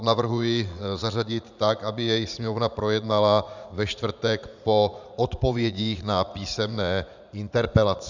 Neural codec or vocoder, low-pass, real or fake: vocoder, 44.1 kHz, 80 mel bands, Vocos; 7.2 kHz; fake